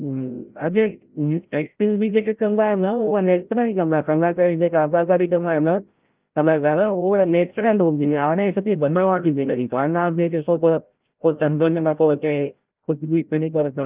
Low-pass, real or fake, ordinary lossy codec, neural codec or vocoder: 3.6 kHz; fake; Opus, 32 kbps; codec, 16 kHz, 0.5 kbps, FreqCodec, larger model